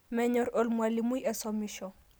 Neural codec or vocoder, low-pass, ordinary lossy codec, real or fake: none; none; none; real